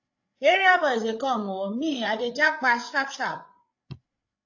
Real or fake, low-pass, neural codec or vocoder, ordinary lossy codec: fake; 7.2 kHz; codec, 16 kHz, 8 kbps, FreqCodec, larger model; AAC, 48 kbps